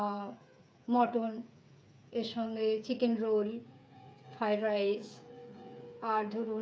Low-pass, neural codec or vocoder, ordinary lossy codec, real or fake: none; codec, 16 kHz, 8 kbps, FreqCodec, smaller model; none; fake